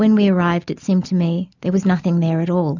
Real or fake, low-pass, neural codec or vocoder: real; 7.2 kHz; none